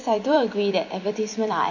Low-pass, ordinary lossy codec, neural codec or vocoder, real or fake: 7.2 kHz; none; vocoder, 44.1 kHz, 128 mel bands every 256 samples, BigVGAN v2; fake